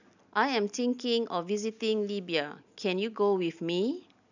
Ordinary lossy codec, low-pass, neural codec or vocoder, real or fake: none; 7.2 kHz; none; real